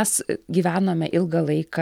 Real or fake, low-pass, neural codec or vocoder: real; 19.8 kHz; none